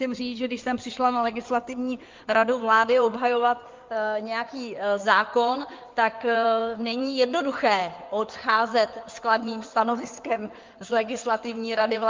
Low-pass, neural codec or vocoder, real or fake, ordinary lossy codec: 7.2 kHz; codec, 16 kHz in and 24 kHz out, 2.2 kbps, FireRedTTS-2 codec; fake; Opus, 24 kbps